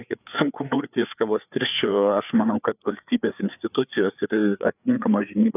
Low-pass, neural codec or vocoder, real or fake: 3.6 kHz; codec, 16 kHz, 4 kbps, FreqCodec, larger model; fake